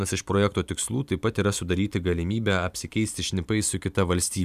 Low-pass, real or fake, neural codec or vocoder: 14.4 kHz; fake; vocoder, 44.1 kHz, 128 mel bands every 512 samples, BigVGAN v2